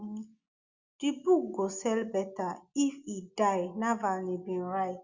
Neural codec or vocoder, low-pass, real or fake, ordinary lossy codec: none; 7.2 kHz; real; Opus, 64 kbps